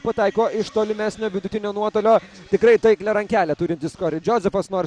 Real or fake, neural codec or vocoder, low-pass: real; none; 9.9 kHz